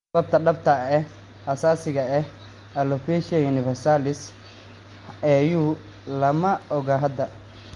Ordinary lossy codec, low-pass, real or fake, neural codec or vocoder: Opus, 32 kbps; 10.8 kHz; real; none